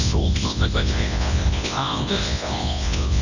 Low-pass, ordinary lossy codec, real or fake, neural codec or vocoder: 7.2 kHz; none; fake; codec, 24 kHz, 0.9 kbps, WavTokenizer, large speech release